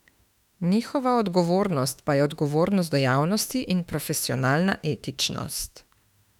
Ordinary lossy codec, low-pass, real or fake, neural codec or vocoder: none; 19.8 kHz; fake; autoencoder, 48 kHz, 32 numbers a frame, DAC-VAE, trained on Japanese speech